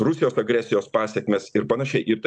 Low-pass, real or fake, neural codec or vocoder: 9.9 kHz; real; none